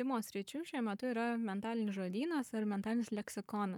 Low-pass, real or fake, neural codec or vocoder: 19.8 kHz; fake; codec, 44.1 kHz, 7.8 kbps, Pupu-Codec